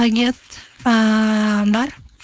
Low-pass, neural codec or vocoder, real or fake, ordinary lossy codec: none; codec, 16 kHz, 4.8 kbps, FACodec; fake; none